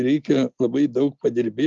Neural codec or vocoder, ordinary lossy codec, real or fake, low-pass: none; Opus, 32 kbps; real; 7.2 kHz